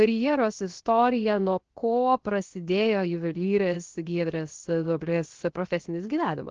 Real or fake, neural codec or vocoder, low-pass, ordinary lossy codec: fake; codec, 16 kHz, 0.7 kbps, FocalCodec; 7.2 kHz; Opus, 16 kbps